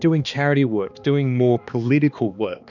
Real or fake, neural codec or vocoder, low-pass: fake; codec, 16 kHz, 2 kbps, X-Codec, HuBERT features, trained on balanced general audio; 7.2 kHz